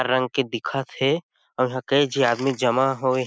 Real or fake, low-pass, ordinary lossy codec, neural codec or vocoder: real; none; none; none